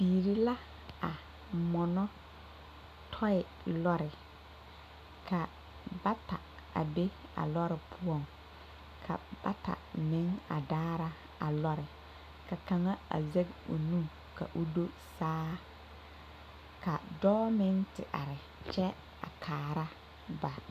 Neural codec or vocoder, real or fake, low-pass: none; real; 14.4 kHz